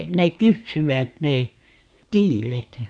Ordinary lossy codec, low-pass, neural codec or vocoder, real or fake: none; 9.9 kHz; codec, 24 kHz, 1 kbps, SNAC; fake